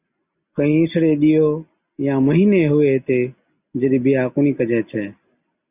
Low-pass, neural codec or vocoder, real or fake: 3.6 kHz; none; real